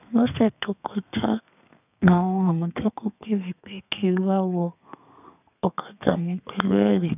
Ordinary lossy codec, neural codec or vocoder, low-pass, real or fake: none; codec, 32 kHz, 1.9 kbps, SNAC; 3.6 kHz; fake